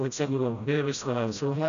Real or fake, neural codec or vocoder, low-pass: fake; codec, 16 kHz, 0.5 kbps, FreqCodec, smaller model; 7.2 kHz